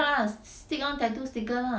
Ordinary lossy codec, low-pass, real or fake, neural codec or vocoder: none; none; real; none